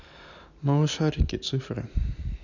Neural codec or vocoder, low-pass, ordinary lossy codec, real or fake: none; 7.2 kHz; none; real